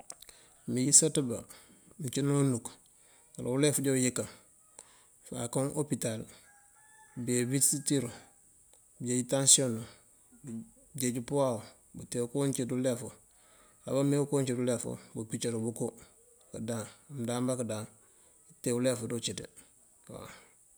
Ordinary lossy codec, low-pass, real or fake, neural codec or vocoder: none; none; real; none